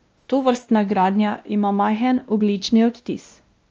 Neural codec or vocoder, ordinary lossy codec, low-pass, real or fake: codec, 16 kHz, 1 kbps, X-Codec, WavLM features, trained on Multilingual LibriSpeech; Opus, 24 kbps; 7.2 kHz; fake